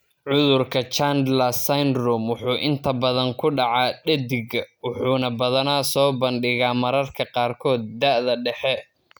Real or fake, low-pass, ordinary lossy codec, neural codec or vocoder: real; none; none; none